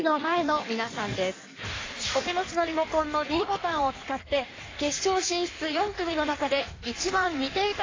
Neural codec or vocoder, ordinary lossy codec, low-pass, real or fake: codec, 16 kHz in and 24 kHz out, 1.1 kbps, FireRedTTS-2 codec; AAC, 32 kbps; 7.2 kHz; fake